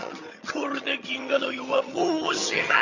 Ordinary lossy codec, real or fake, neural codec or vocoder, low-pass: none; fake; vocoder, 22.05 kHz, 80 mel bands, HiFi-GAN; 7.2 kHz